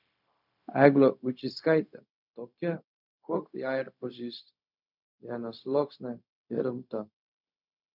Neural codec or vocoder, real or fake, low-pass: codec, 16 kHz, 0.4 kbps, LongCat-Audio-Codec; fake; 5.4 kHz